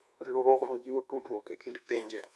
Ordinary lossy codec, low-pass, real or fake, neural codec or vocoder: none; none; fake; codec, 24 kHz, 1.2 kbps, DualCodec